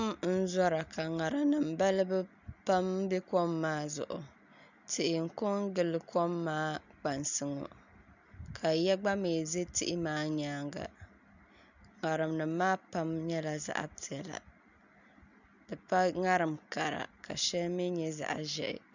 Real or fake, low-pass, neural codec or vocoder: real; 7.2 kHz; none